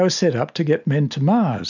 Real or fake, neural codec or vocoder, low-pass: real; none; 7.2 kHz